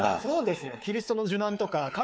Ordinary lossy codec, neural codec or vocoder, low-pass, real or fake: none; codec, 16 kHz, 4 kbps, X-Codec, WavLM features, trained on Multilingual LibriSpeech; none; fake